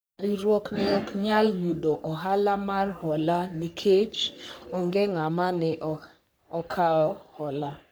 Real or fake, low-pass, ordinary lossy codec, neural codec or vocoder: fake; none; none; codec, 44.1 kHz, 3.4 kbps, Pupu-Codec